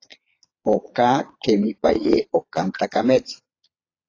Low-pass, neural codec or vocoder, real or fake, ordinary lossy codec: 7.2 kHz; vocoder, 22.05 kHz, 80 mel bands, Vocos; fake; AAC, 32 kbps